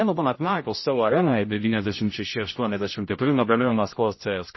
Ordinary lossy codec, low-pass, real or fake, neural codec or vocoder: MP3, 24 kbps; 7.2 kHz; fake; codec, 16 kHz, 0.5 kbps, X-Codec, HuBERT features, trained on general audio